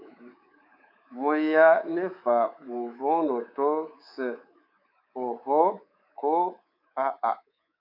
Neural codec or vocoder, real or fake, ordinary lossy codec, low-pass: codec, 24 kHz, 3.1 kbps, DualCodec; fake; AAC, 32 kbps; 5.4 kHz